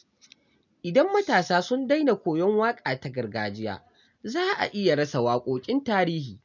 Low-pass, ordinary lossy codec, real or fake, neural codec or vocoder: 7.2 kHz; none; real; none